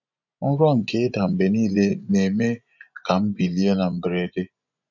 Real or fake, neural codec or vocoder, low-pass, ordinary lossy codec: fake; vocoder, 24 kHz, 100 mel bands, Vocos; 7.2 kHz; none